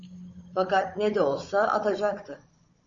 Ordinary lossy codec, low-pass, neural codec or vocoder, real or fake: MP3, 32 kbps; 7.2 kHz; codec, 16 kHz, 16 kbps, FunCodec, trained on Chinese and English, 50 frames a second; fake